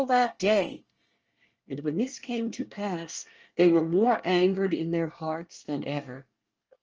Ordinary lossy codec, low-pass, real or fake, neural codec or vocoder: Opus, 24 kbps; 7.2 kHz; fake; codec, 24 kHz, 1 kbps, SNAC